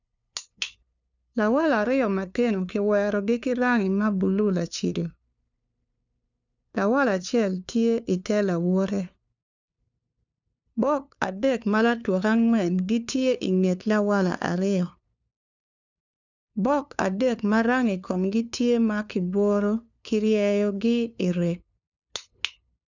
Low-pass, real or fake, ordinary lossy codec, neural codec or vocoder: 7.2 kHz; fake; none; codec, 16 kHz, 2 kbps, FunCodec, trained on LibriTTS, 25 frames a second